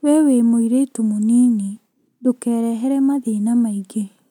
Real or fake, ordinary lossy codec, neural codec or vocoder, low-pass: real; none; none; 19.8 kHz